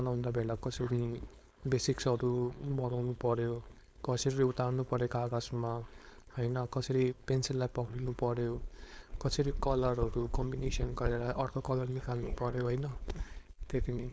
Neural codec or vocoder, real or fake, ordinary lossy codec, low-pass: codec, 16 kHz, 4.8 kbps, FACodec; fake; none; none